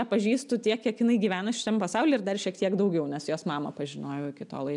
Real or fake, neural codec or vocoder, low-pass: real; none; 10.8 kHz